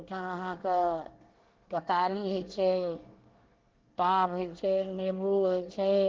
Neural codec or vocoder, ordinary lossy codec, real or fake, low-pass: codec, 24 kHz, 1 kbps, SNAC; Opus, 16 kbps; fake; 7.2 kHz